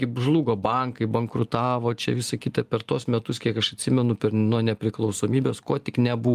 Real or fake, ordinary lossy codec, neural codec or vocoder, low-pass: real; Opus, 32 kbps; none; 14.4 kHz